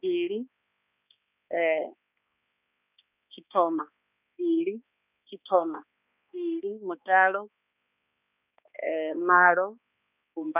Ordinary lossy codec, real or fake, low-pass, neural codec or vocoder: none; fake; 3.6 kHz; codec, 16 kHz, 2 kbps, X-Codec, HuBERT features, trained on balanced general audio